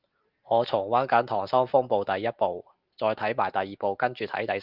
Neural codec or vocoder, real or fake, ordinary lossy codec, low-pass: none; real; Opus, 32 kbps; 5.4 kHz